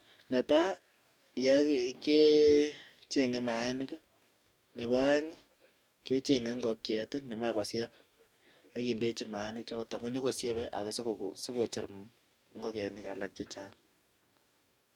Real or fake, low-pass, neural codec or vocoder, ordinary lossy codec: fake; 19.8 kHz; codec, 44.1 kHz, 2.6 kbps, DAC; none